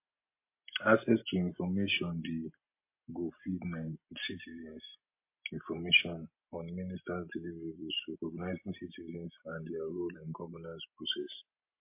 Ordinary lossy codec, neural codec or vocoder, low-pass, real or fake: MP3, 24 kbps; none; 3.6 kHz; real